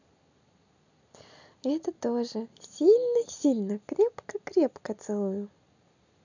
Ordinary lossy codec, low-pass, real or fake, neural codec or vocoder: none; 7.2 kHz; real; none